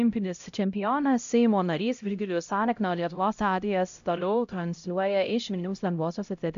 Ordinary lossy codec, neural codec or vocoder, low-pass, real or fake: AAC, 96 kbps; codec, 16 kHz, 0.5 kbps, X-Codec, HuBERT features, trained on LibriSpeech; 7.2 kHz; fake